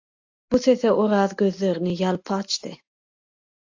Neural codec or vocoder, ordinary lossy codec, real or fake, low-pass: none; AAC, 48 kbps; real; 7.2 kHz